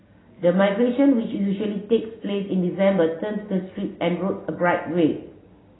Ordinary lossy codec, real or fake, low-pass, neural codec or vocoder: AAC, 16 kbps; real; 7.2 kHz; none